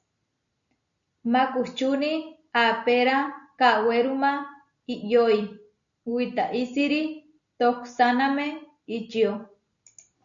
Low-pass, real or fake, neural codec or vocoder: 7.2 kHz; real; none